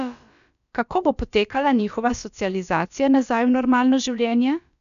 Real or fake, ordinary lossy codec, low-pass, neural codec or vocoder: fake; none; 7.2 kHz; codec, 16 kHz, about 1 kbps, DyCAST, with the encoder's durations